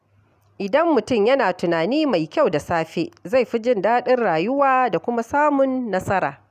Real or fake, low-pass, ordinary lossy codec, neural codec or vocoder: real; 14.4 kHz; none; none